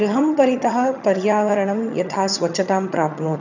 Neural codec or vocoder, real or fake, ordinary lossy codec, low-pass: vocoder, 22.05 kHz, 80 mel bands, HiFi-GAN; fake; none; 7.2 kHz